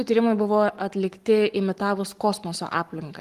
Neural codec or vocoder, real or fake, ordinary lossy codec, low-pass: codec, 44.1 kHz, 7.8 kbps, Pupu-Codec; fake; Opus, 24 kbps; 14.4 kHz